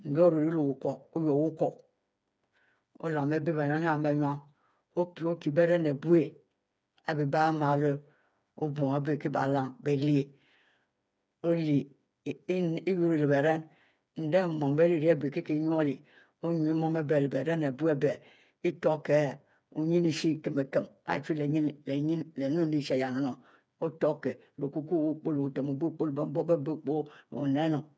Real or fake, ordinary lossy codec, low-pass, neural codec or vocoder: fake; none; none; codec, 16 kHz, 4 kbps, FreqCodec, smaller model